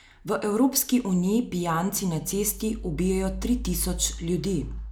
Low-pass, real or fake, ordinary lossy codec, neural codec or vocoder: none; real; none; none